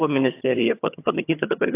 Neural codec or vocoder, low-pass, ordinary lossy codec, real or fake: vocoder, 22.05 kHz, 80 mel bands, HiFi-GAN; 3.6 kHz; AAC, 24 kbps; fake